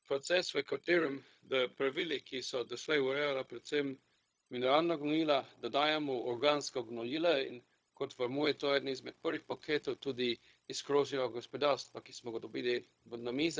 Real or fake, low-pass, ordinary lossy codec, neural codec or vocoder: fake; none; none; codec, 16 kHz, 0.4 kbps, LongCat-Audio-Codec